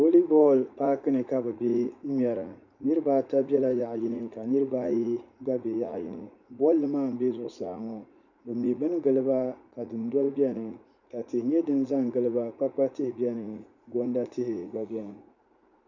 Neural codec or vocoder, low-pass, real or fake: vocoder, 44.1 kHz, 80 mel bands, Vocos; 7.2 kHz; fake